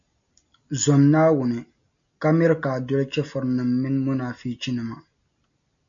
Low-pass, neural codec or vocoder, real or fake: 7.2 kHz; none; real